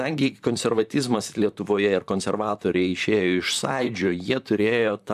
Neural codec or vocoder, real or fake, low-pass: vocoder, 44.1 kHz, 128 mel bands, Pupu-Vocoder; fake; 14.4 kHz